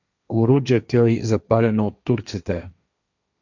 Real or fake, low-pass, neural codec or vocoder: fake; 7.2 kHz; codec, 16 kHz, 1.1 kbps, Voila-Tokenizer